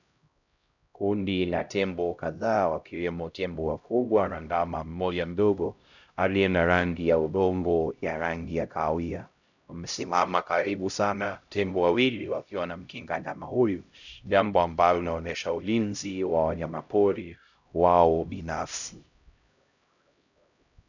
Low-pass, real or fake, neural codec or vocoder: 7.2 kHz; fake; codec, 16 kHz, 0.5 kbps, X-Codec, HuBERT features, trained on LibriSpeech